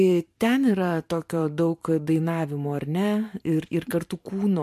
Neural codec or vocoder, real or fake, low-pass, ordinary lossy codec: vocoder, 44.1 kHz, 128 mel bands every 512 samples, BigVGAN v2; fake; 14.4 kHz; MP3, 64 kbps